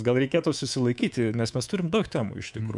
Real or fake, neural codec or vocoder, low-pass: fake; codec, 24 kHz, 3.1 kbps, DualCodec; 10.8 kHz